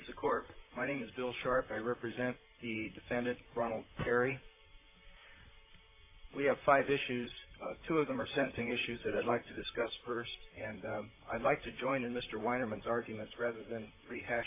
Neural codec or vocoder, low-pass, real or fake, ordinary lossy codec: vocoder, 44.1 kHz, 128 mel bands, Pupu-Vocoder; 3.6 kHz; fake; AAC, 24 kbps